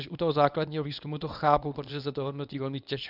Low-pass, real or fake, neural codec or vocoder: 5.4 kHz; fake; codec, 24 kHz, 0.9 kbps, WavTokenizer, medium speech release version 1